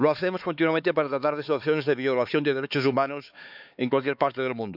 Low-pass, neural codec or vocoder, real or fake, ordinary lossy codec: 5.4 kHz; codec, 16 kHz, 4 kbps, X-Codec, HuBERT features, trained on LibriSpeech; fake; none